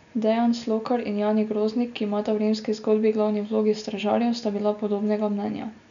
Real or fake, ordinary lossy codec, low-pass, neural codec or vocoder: real; none; 7.2 kHz; none